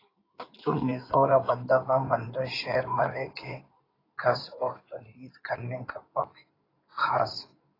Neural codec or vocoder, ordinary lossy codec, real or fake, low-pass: codec, 16 kHz in and 24 kHz out, 2.2 kbps, FireRedTTS-2 codec; AAC, 24 kbps; fake; 5.4 kHz